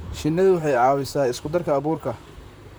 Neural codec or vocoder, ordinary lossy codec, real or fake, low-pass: vocoder, 44.1 kHz, 128 mel bands, Pupu-Vocoder; none; fake; none